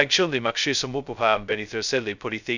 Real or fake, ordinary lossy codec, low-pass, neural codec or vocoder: fake; none; 7.2 kHz; codec, 16 kHz, 0.2 kbps, FocalCodec